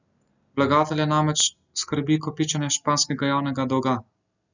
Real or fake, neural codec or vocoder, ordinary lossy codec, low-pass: real; none; none; 7.2 kHz